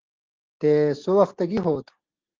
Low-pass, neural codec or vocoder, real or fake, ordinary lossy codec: 7.2 kHz; none; real; Opus, 16 kbps